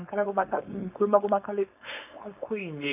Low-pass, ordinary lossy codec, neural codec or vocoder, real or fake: 3.6 kHz; none; codec, 16 kHz, 4.8 kbps, FACodec; fake